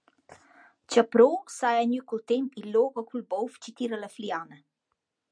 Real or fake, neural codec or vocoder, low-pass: real; none; 9.9 kHz